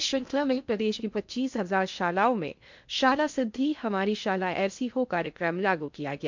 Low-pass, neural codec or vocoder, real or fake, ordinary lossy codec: 7.2 kHz; codec, 16 kHz in and 24 kHz out, 0.6 kbps, FocalCodec, streaming, 2048 codes; fake; MP3, 64 kbps